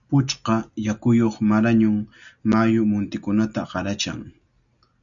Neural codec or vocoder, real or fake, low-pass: none; real; 7.2 kHz